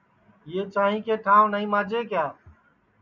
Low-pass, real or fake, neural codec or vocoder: 7.2 kHz; real; none